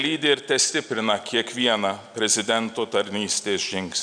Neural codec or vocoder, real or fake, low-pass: none; real; 9.9 kHz